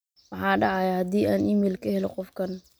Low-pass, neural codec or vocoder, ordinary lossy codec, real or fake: none; none; none; real